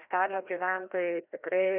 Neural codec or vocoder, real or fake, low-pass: codec, 16 kHz, 1 kbps, FreqCodec, larger model; fake; 3.6 kHz